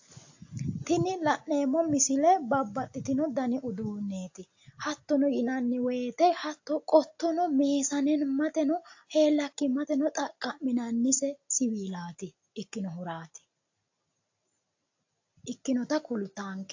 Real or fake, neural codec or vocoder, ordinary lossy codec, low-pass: real; none; AAC, 48 kbps; 7.2 kHz